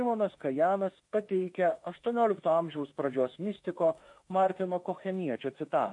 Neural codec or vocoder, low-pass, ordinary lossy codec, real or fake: autoencoder, 48 kHz, 32 numbers a frame, DAC-VAE, trained on Japanese speech; 10.8 kHz; MP3, 48 kbps; fake